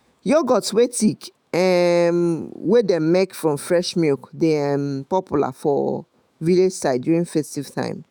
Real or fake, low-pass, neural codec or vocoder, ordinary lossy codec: fake; none; autoencoder, 48 kHz, 128 numbers a frame, DAC-VAE, trained on Japanese speech; none